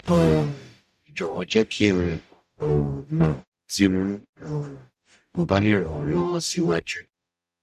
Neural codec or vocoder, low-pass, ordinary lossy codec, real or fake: codec, 44.1 kHz, 0.9 kbps, DAC; 14.4 kHz; none; fake